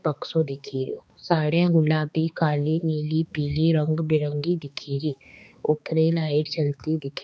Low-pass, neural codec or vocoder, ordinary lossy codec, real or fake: none; codec, 16 kHz, 2 kbps, X-Codec, HuBERT features, trained on balanced general audio; none; fake